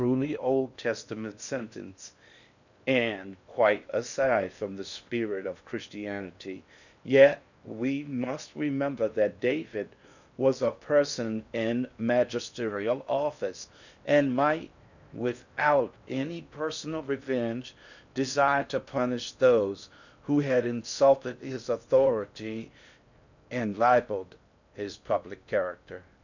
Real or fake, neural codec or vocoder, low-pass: fake; codec, 16 kHz in and 24 kHz out, 0.6 kbps, FocalCodec, streaming, 4096 codes; 7.2 kHz